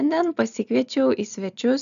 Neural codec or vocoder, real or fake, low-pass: none; real; 7.2 kHz